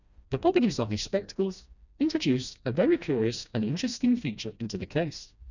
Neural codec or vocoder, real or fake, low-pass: codec, 16 kHz, 1 kbps, FreqCodec, smaller model; fake; 7.2 kHz